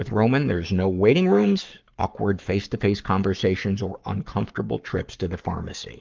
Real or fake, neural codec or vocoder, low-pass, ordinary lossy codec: fake; codec, 44.1 kHz, 7.8 kbps, Pupu-Codec; 7.2 kHz; Opus, 32 kbps